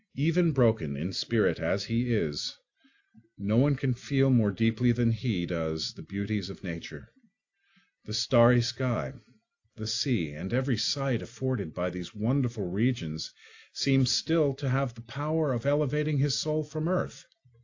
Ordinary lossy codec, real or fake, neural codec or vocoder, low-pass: AAC, 48 kbps; real; none; 7.2 kHz